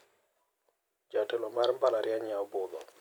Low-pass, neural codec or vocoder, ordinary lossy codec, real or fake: none; none; none; real